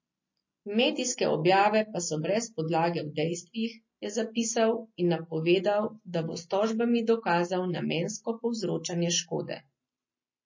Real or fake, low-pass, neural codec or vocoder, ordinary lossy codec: real; 7.2 kHz; none; MP3, 32 kbps